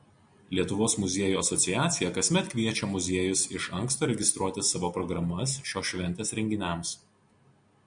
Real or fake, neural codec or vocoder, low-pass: real; none; 9.9 kHz